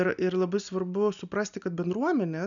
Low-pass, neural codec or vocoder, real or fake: 7.2 kHz; none; real